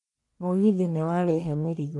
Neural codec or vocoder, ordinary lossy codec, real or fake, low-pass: codec, 44.1 kHz, 1.7 kbps, Pupu-Codec; none; fake; 10.8 kHz